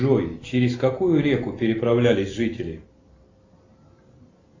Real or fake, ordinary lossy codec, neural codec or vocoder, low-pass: real; AAC, 32 kbps; none; 7.2 kHz